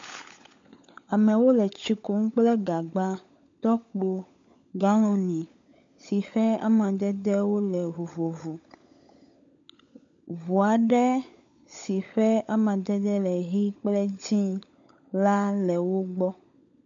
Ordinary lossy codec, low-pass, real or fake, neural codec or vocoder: MP3, 48 kbps; 7.2 kHz; fake; codec, 16 kHz, 16 kbps, FunCodec, trained on LibriTTS, 50 frames a second